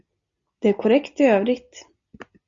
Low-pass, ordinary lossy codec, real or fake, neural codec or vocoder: 7.2 kHz; Opus, 64 kbps; real; none